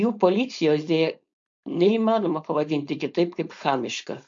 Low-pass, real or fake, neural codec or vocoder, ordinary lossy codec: 7.2 kHz; fake; codec, 16 kHz, 4.8 kbps, FACodec; MP3, 48 kbps